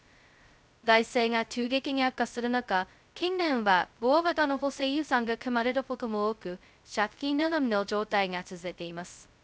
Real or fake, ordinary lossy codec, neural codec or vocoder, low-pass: fake; none; codec, 16 kHz, 0.2 kbps, FocalCodec; none